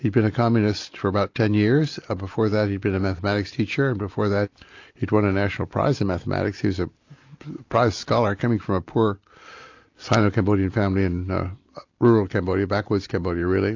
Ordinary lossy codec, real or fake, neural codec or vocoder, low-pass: AAC, 48 kbps; real; none; 7.2 kHz